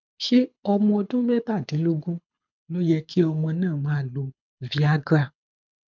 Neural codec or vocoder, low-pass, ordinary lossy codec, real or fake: codec, 24 kHz, 6 kbps, HILCodec; 7.2 kHz; MP3, 64 kbps; fake